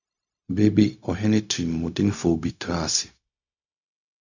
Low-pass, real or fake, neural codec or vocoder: 7.2 kHz; fake; codec, 16 kHz, 0.4 kbps, LongCat-Audio-Codec